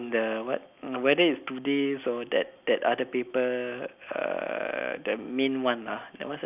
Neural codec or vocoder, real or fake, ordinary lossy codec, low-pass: none; real; none; 3.6 kHz